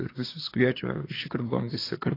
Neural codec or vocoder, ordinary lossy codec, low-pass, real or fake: codec, 24 kHz, 1 kbps, SNAC; AAC, 24 kbps; 5.4 kHz; fake